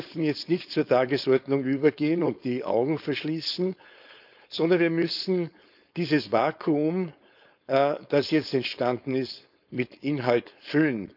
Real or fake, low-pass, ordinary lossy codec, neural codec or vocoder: fake; 5.4 kHz; none; codec, 16 kHz, 4.8 kbps, FACodec